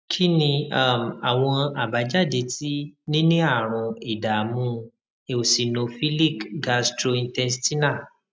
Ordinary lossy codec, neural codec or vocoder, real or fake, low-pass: none; none; real; none